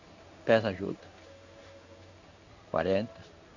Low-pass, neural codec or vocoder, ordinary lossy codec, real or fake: 7.2 kHz; codec, 16 kHz in and 24 kHz out, 1 kbps, XY-Tokenizer; none; fake